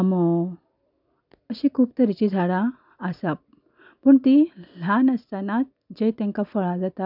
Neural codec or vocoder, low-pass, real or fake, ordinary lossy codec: none; 5.4 kHz; real; none